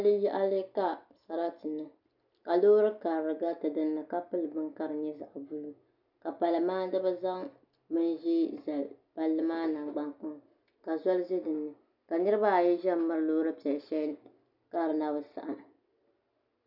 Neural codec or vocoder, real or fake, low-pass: none; real; 5.4 kHz